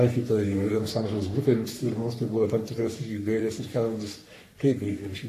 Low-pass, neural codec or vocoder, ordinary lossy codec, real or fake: 14.4 kHz; codec, 44.1 kHz, 3.4 kbps, Pupu-Codec; AAC, 64 kbps; fake